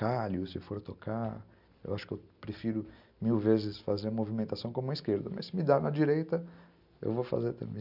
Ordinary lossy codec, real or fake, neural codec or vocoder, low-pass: none; real; none; 5.4 kHz